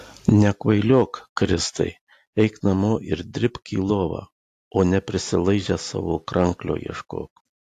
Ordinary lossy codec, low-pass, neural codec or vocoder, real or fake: AAC, 64 kbps; 14.4 kHz; none; real